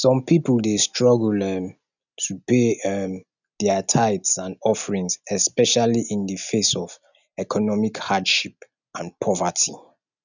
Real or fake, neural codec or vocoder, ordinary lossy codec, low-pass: real; none; none; 7.2 kHz